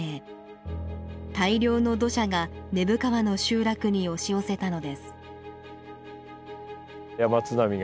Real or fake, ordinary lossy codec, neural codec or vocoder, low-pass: real; none; none; none